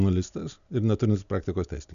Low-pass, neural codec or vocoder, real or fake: 7.2 kHz; none; real